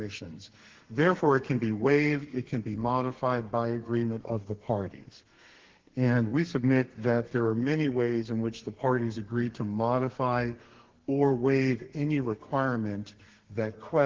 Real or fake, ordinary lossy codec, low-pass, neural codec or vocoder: fake; Opus, 16 kbps; 7.2 kHz; codec, 32 kHz, 1.9 kbps, SNAC